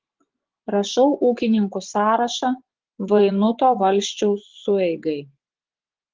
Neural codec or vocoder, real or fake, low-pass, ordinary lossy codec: vocoder, 24 kHz, 100 mel bands, Vocos; fake; 7.2 kHz; Opus, 16 kbps